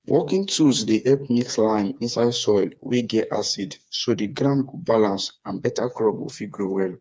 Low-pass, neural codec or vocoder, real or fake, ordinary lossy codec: none; codec, 16 kHz, 4 kbps, FreqCodec, smaller model; fake; none